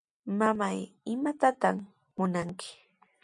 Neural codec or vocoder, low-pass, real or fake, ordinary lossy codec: none; 10.8 kHz; real; MP3, 64 kbps